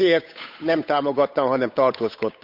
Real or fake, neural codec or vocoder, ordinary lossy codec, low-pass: fake; codec, 16 kHz, 8 kbps, FunCodec, trained on Chinese and English, 25 frames a second; none; 5.4 kHz